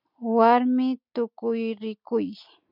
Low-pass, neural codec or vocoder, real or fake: 5.4 kHz; none; real